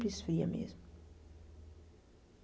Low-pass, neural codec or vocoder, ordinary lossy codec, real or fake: none; none; none; real